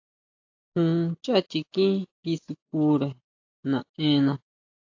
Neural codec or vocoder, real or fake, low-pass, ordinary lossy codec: none; real; 7.2 kHz; AAC, 48 kbps